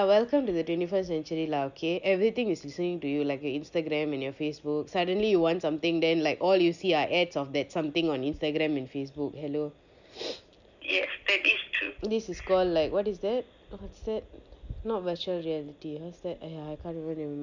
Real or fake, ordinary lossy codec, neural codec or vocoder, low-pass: real; none; none; 7.2 kHz